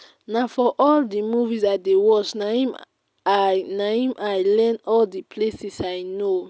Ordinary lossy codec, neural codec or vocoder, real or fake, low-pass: none; none; real; none